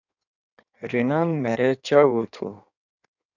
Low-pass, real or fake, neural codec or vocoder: 7.2 kHz; fake; codec, 16 kHz in and 24 kHz out, 1.1 kbps, FireRedTTS-2 codec